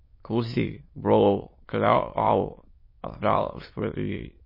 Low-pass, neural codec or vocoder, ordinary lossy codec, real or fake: 5.4 kHz; autoencoder, 22.05 kHz, a latent of 192 numbers a frame, VITS, trained on many speakers; MP3, 24 kbps; fake